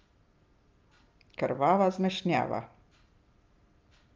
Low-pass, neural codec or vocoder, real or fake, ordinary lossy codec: 7.2 kHz; none; real; Opus, 24 kbps